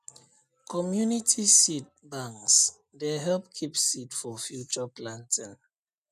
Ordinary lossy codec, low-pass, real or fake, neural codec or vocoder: none; 14.4 kHz; real; none